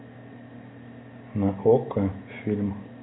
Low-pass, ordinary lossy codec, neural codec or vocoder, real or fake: 7.2 kHz; AAC, 16 kbps; none; real